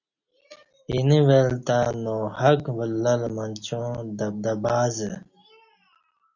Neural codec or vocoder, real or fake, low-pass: none; real; 7.2 kHz